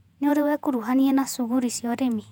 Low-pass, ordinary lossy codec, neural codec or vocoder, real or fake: 19.8 kHz; none; vocoder, 48 kHz, 128 mel bands, Vocos; fake